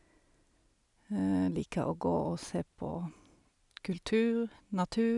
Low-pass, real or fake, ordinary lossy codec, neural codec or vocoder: 10.8 kHz; real; none; none